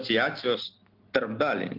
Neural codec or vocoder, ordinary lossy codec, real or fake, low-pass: none; Opus, 32 kbps; real; 5.4 kHz